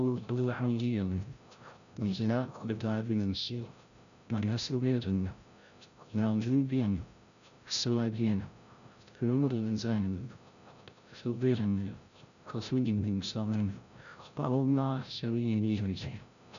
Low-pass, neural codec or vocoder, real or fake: 7.2 kHz; codec, 16 kHz, 0.5 kbps, FreqCodec, larger model; fake